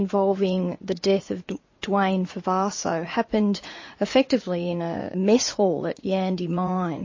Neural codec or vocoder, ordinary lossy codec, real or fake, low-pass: vocoder, 44.1 kHz, 80 mel bands, Vocos; MP3, 32 kbps; fake; 7.2 kHz